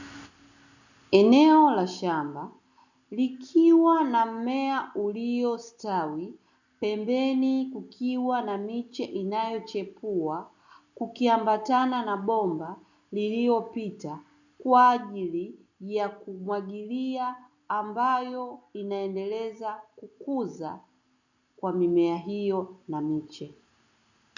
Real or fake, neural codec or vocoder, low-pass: real; none; 7.2 kHz